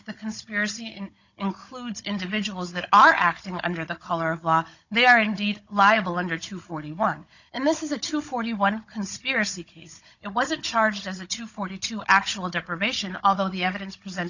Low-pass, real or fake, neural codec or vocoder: 7.2 kHz; fake; codec, 16 kHz, 16 kbps, FunCodec, trained on Chinese and English, 50 frames a second